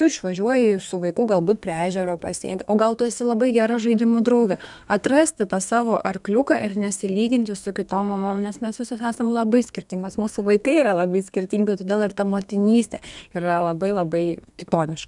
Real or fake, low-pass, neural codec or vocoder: fake; 10.8 kHz; codec, 32 kHz, 1.9 kbps, SNAC